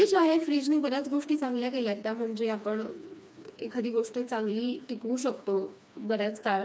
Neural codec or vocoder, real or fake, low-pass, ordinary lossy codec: codec, 16 kHz, 2 kbps, FreqCodec, smaller model; fake; none; none